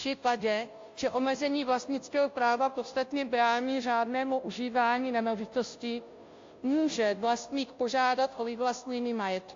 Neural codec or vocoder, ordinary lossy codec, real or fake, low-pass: codec, 16 kHz, 0.5 kbps, FunCodec, trained on Chinese and English, 25 frames a second; AAC, 48 kbps; fake; 7.2 kHz